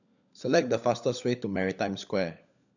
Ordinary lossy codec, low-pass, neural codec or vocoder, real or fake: none; 7.2 kHz; codec, 16 kHz, 16 kbps, FunCodec, trained on LibriTTS, 50 frames a second; fake